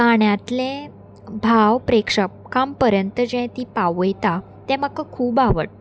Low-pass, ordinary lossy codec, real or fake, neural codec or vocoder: none; none; real; none